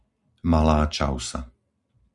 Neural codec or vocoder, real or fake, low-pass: none; real; 10.8 kHz